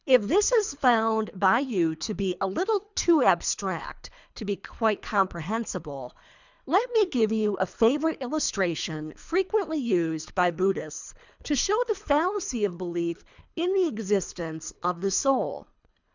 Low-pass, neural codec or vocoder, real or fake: 7.2 kHz; codec, 24 kHz, 3 kbps, HILCodec; fake